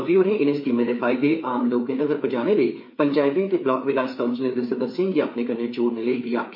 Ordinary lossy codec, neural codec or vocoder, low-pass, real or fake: MP3, 32 kbps; codec, 16 kHz, 4 kbps, FreqCodec, larger model; 5.4 kHz; fake